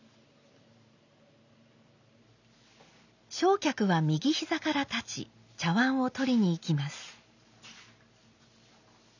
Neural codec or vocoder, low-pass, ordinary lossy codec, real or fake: none; 7.2 kHz; none; real